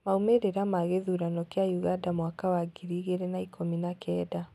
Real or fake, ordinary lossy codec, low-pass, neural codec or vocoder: real; none; 14.4 kHz; none